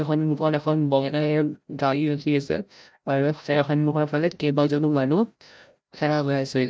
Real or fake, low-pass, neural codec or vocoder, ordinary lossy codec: fake; none; codec, 16 kHz, 0.5 kbps, FreqCodec, larger model; none